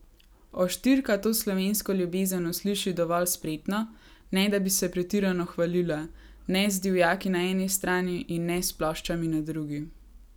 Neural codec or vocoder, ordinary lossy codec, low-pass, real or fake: none; none; none; real